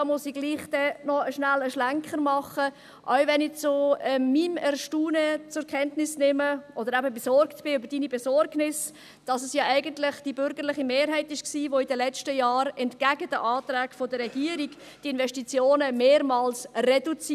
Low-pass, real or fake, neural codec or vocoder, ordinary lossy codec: 14.4 kHz; real; none; none